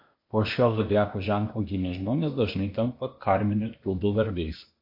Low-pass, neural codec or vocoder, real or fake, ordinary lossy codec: 5.4 kHz; codec, 16 kHz, 0.8 kbps, ZipCodec; fake; MP3, 32 kbps